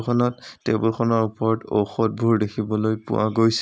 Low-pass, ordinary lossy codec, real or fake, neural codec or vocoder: none; none; real; none